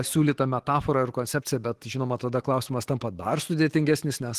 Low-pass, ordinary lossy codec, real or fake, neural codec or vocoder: 14.4 kHz; Opus, 16 kbps; fake; autoencoder, 48 kHz, 128 numbers a frame, DAC-VAE, trained on Japanese speech